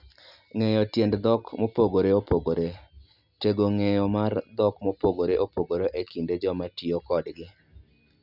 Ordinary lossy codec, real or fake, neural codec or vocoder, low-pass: none; real; none; 5.4 kHz